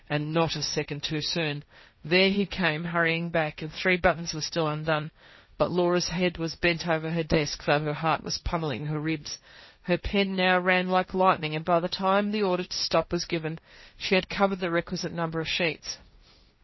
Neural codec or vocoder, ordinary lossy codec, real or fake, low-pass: codec, 16 kHz, 1.1 kbps, Voila-Tokenizer; MP3, 24 kbps; fake; 7.2 kHz